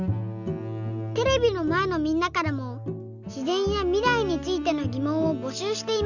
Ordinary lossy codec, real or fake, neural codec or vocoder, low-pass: none; real; none; 7.2 kHz